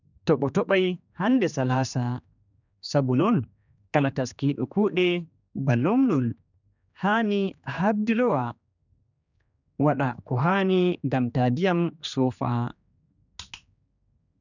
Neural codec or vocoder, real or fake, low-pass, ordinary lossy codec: codec, 16 kHz, 2 kbps, X-Codec, HuBERT features, trained on general audio; fake; 7.2 kHz; none